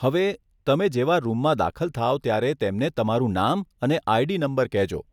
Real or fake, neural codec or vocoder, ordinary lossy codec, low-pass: real; none; none; 19.8 kHz